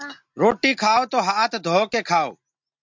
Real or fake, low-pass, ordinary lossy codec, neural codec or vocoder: real; 7.2 kHz; MP3, 64 kbps; none